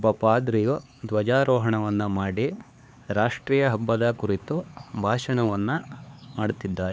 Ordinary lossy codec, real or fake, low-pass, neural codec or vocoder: none; fake; none; codec, 16 kHz, 4 kbps, X-Codec, HuBERT features, trained on LibriSpeech